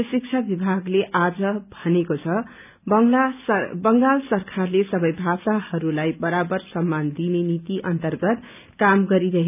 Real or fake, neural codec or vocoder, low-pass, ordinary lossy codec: real; none; 3.6 kHz; none